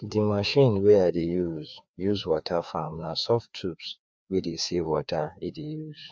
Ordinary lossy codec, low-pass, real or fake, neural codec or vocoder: none; none; fake; codec, 16 kHz, 2 kbps, FreqCodec, larger model